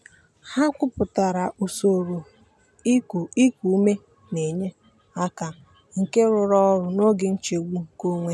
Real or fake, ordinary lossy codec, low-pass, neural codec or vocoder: real; none; none; none